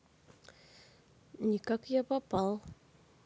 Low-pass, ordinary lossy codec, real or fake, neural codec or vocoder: none; none; real; none